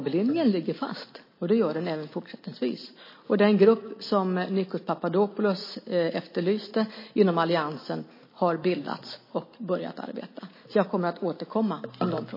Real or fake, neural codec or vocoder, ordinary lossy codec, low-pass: real; none; MP3, 24 kbps; 5.4 kHz